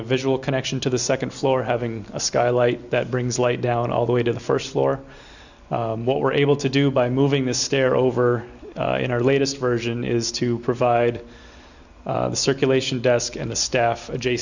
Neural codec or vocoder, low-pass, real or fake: none; 7.2 kHz; real